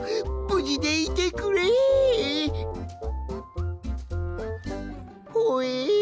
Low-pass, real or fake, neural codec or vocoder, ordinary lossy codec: none; real; none; none